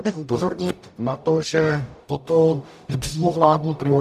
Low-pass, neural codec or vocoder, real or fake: 14.4 kHz; codec, 44.1 kHz, 0.9 kbps, DAC; fake